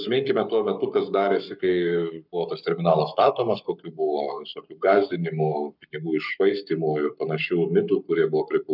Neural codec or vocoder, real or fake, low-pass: codec, 44.1 kHz, 7.8 kbps, Pupu-Codec; fake; 5.4 kHz